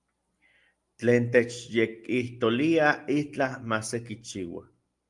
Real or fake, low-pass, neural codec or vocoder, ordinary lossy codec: real; 10.8 kHz; none; Opus, 32 kbps